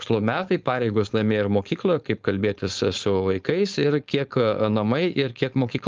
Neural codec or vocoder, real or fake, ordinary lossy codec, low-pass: codec, 16 kHz, 4.8 kbps, FACodec; fake; Opus, 32 kbps; 7.2 kHz